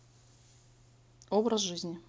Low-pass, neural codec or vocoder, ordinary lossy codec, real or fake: none; none; none; real